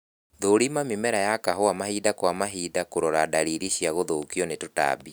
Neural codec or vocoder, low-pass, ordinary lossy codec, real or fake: none; none; none; real